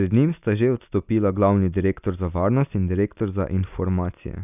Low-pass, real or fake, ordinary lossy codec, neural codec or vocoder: 3.6 kHz; real; none; none